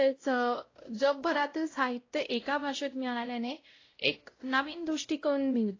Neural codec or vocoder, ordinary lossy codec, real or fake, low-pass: codec, 16 kHz, 0.5 kbps, X-Codec, WavLM features, trained on Multilingual LibriSpeech; AAC, 32 kbps; fake; 7.2 kHz